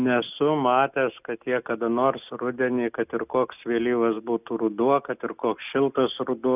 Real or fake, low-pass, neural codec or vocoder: real; 3.6 kHz; none